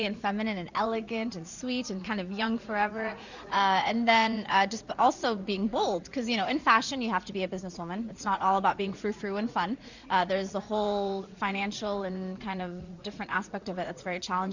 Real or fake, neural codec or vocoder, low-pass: fake; vocoder, 44.1 kHz, 80 mel bands, Vocos; 7.2 kHz